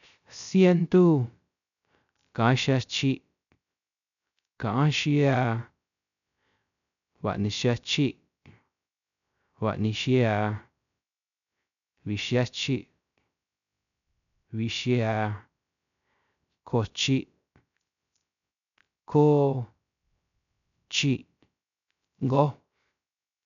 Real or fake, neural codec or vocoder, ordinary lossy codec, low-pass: fake; codec, 16 kHz, 0.3 kbps, FocalCodec; none; 7.2 kHz